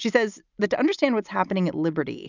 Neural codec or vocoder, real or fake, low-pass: none; real; 7.2 kHz